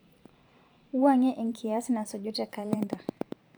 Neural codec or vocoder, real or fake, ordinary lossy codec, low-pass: vocoder, 44.1 kHz, 128 mel bands every 512 samples, BigVGAN v2; fake; none; none